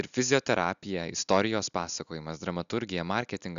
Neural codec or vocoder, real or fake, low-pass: none; real; 7.2 kHz